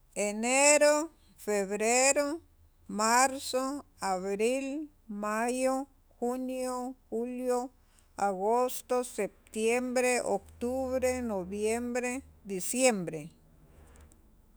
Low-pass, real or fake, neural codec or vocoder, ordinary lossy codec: none; fake; autoencoder, 48 kHz, 128 numbers a frame, DAC-VAE, trained on Japanese speech; none